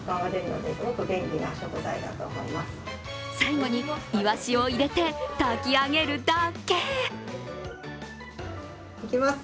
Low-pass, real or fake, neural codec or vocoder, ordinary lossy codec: none; real; none; none